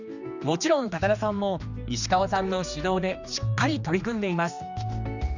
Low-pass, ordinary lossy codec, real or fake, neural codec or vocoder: 7.2 kHz; none; fake; codec, 16 kHz, 2 kbps, X-Codec, HuBERT features, trained on general audio